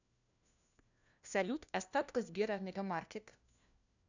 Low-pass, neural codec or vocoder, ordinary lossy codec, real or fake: 7.2 kHz; codec, 16 kHz, 1 kbps, FunCodec, trained on LibriTTS, 50 frames a second; none; fake